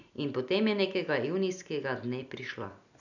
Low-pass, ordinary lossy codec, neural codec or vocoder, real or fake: 7.2 kHz; none; none; real